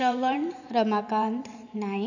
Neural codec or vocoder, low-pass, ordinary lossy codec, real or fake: vocoder, 44.1 kHz, 128 mel bands every 512 samples, BigVGAN v2; 7.2 kHz; none; fake